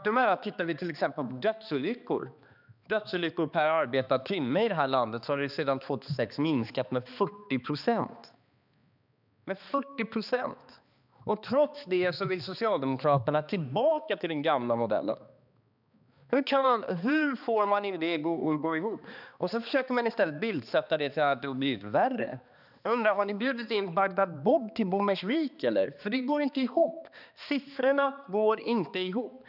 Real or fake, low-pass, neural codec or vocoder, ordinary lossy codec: fake; 5.4 kHz; codec, 16 kHz, 2 kbps, X-Codec, HuBERT features, trained on balanced general audio; none